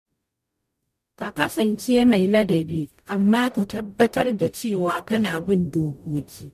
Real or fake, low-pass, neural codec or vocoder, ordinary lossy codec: fake; 14.4 kHz; codec, 44.1 kHz, 0.9 kbps, DAC; MP3, 96 kbps